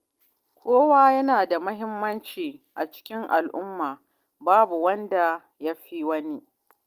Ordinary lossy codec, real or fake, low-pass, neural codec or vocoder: Opus, 32 kbps; real; 14.4 kHz; none